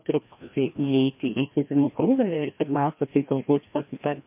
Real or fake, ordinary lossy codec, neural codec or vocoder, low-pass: fake; MP3, 32 kbps; codec, 16 kHz, 1 kbps, FreqCodec, larger model; 3.6 kHz